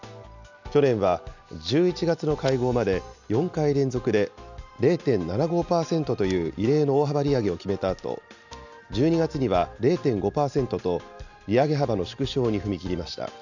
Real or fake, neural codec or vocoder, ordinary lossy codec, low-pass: real; none; none; 7.2 kHz